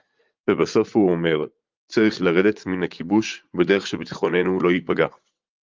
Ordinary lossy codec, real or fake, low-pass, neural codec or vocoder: Opus, 24 kbps; fake; 7.2 kHz; vocoder, 44.1 kHz, 80 mel bands, Vocos